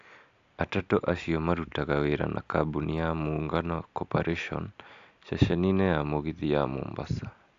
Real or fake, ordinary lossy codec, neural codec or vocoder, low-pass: real; AAC, 64 kbps; none; 7.2 kHz